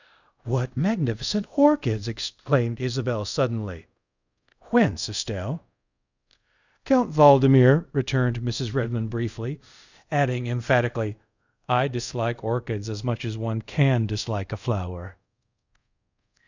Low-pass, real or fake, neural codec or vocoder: 7.2 kHz; fake; codec, 24 kHz, 0.5 kbps, DualCodec